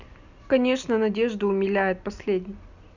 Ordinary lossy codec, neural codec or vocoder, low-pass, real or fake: none; none; 7.2 kHz; real